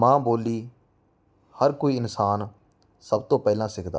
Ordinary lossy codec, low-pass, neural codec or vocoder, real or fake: none; none; none; real